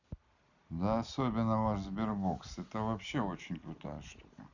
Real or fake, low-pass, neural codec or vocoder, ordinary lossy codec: real; 7.2 kHz; none; none